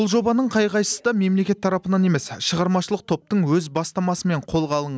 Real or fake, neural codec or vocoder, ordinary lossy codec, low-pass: real; none; none; none